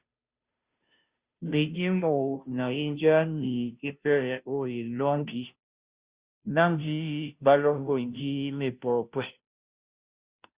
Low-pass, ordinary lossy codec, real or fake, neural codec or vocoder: 3.6 kHz; Opus, 64 kbps; fake; codec, 16 kHz, 0.5 kbps, FunCodec, trained on Chinese and English, 25 frames a second